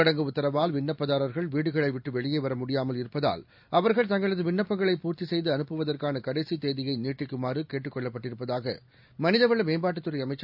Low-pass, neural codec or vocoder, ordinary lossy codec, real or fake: 5.4 kHz; none; none; real